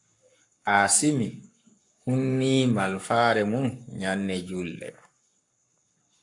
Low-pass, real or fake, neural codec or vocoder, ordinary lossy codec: 10.8 kHz; fake; codec, 44.1 kHz, 7.8 kbps, Pupu-Codec; AAC, 64 kbps